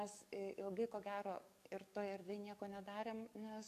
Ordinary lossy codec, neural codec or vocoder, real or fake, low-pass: AAC, 96 kbps; codec, 44.1 kHz, 7.8 kbps, DAC; fake; 14.4 kHz